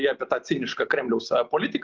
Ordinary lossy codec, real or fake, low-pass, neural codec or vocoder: Opus, 32 kbps; real; 7.2 kHz; none